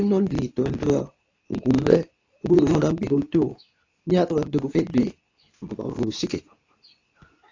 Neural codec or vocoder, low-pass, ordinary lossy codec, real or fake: codec, 24 kHz, 0.9 kbps, WavTokenizer, medium speech release version 2; 7.2 kHz; AAC, 48 kbps; fake